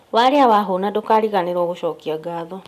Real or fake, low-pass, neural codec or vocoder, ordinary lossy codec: real; 14.4 kHz; none; none